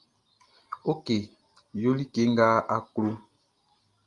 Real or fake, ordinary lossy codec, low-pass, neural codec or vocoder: real; Opus, 32 kbps; 10.8 kHz; none